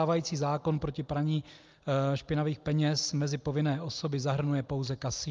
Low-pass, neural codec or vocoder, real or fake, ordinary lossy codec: 7.2 kHz; none; real; Opus, 32 kbps